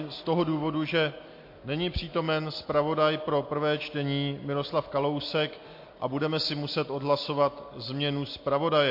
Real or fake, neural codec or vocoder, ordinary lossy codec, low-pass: real; none; MP3, 32 kbps; 5.4 kHz